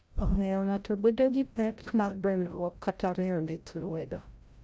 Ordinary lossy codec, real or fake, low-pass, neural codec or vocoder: none; fake; none; codec, 16 kHz, 0.5 kbps, FreqCodec, larger model